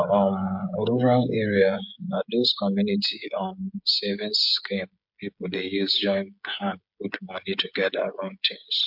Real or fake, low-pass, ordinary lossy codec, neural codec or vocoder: fake; 5.4 kHz; MP3, 48 kbps; codec, 16 kHz, 16 kbps, FreqCodec, smaller model